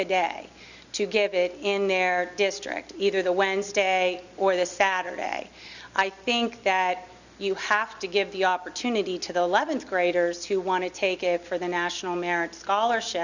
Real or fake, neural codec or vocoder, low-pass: real; none; 7.2 kHz